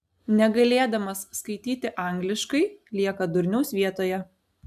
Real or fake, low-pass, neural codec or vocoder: real; 14.4 kHz; none